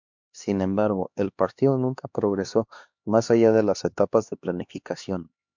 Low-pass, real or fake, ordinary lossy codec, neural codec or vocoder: 7.2 kHz; fake; MP3, 64 kbps; codec, 16 kHz, 2 kbps, X-Codec, HuBERT features, trained on LibriSpeech